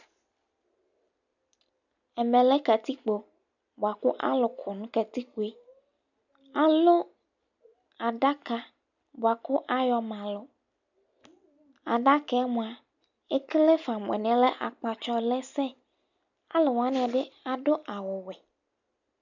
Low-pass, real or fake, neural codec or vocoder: 7.2 kHz; real; none